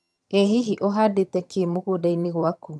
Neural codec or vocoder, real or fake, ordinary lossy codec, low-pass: vocoder, 22.05 kHz, 80 mel bands, HiFi-GAN; fake; none; none